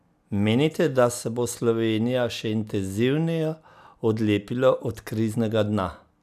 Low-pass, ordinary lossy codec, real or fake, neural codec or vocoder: 14.4 kHz; none; real; none